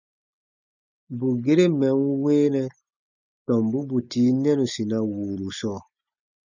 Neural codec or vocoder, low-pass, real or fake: none; 7.2 kHz; real